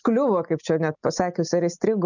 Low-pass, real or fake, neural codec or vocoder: 7.2 kHz; real; none